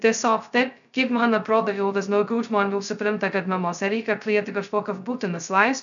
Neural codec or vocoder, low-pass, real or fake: codec, 16 kHz, 0.2 kbps, FocalCodec; 7.2 kHz; fake